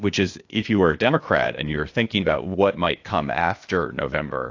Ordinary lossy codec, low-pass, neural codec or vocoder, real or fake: AAC, 48 kbps; 7.2 kHz; codec, 16 kHz, 0.8 kbps, ZipCodec; fake